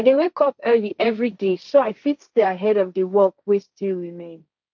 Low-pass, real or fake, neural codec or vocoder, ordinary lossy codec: 7.2 kHz; fake; codec, 16 kHz, 1.1 kbps, Voila-Tokenizer; none